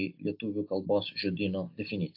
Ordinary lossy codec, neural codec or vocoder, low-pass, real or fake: AAC, 48 kbps; none; 5.4 kHz; real